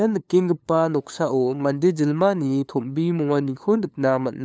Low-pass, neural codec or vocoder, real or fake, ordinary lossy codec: none; codec, 16 kHz, 2 kbps, FunCodec, trained on LibriTTS, 25 frames a second; fake; none